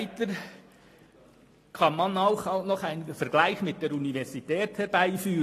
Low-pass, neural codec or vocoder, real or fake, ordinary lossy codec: 14.4 kHz; none; real; AAC, 48 kbps